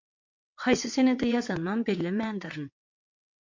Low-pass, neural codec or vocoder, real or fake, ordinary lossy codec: 7.2 kHz; vocoder, 22.05 kHz, 80 mel bands, WaveNeXt; fake; MP3, 48 kbps